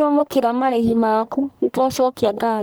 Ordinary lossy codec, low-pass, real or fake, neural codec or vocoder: none; none; fake; codec, 44.1 kHz, 1.7 kbps, Pupu-Codec